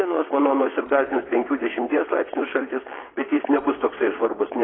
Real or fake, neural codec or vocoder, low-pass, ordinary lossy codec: fake; vocoder, 22.05 kHz, 80 mel bands, WaveNeXt; 7.2 kHz; AAC, 16 kbps